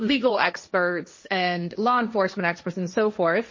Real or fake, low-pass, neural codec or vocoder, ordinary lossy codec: fake; 7.2 kHz; codec, 16 kHz, 1.1 kbps, Voila-Tokenizer; MP3, 32 kbps